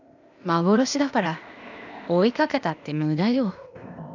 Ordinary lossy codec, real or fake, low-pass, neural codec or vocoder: none; fake; 7.2 kHz; codec, 16 kHz, 0.8 kbps, ZipCodec